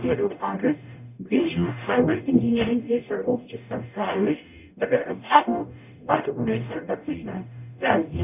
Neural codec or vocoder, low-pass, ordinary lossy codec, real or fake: codec, 44.1 kHz, 0.9 kbps, DAC; 3.6 kHz; none; fake